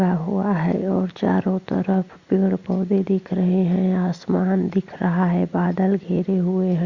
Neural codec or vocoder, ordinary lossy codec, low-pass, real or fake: none; Opus, 64 kbps; 7.2 kHz; real